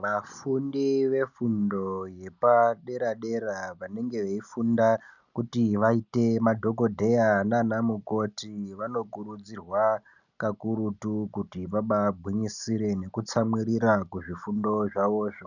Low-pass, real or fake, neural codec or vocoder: 7.2 kHz; real; none